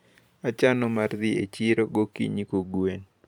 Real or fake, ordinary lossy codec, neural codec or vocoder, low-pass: fake; none; vocoder, 44.1 kHz, 128 mel bands every 512 samples, BigVGAN v2; 19.8 kHz